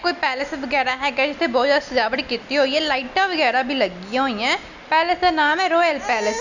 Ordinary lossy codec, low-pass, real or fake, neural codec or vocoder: none; 7.2 kHz; real; none